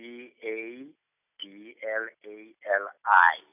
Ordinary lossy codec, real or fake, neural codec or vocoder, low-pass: none; real; none; 3.6 kHz